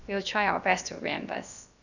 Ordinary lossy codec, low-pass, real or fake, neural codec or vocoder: none; 7.2 kHz; fake; codec, 16 kHz, about 1 kbps, DyCAST, with the encoder's durations